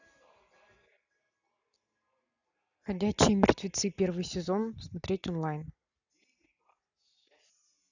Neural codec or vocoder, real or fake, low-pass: none; real; 7.2 kHz